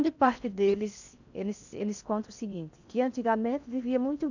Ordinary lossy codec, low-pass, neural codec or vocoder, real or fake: none; 7.2 kHz; codec, 16 kHz in and 24 kHz out, 0.6 kbps, FocalCodec, streaming, 4096 codes; fake